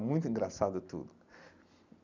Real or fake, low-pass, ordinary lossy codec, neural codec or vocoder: real; 7.2 kHz; none; none